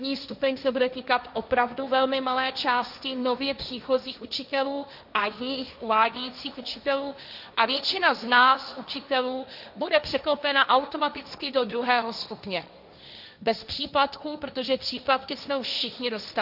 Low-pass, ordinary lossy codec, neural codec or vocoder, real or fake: 5.4 kHz; Opus, 64 kbps; codec, 16 kHz, 1.1 kbps, Voila-Tokenizer; fake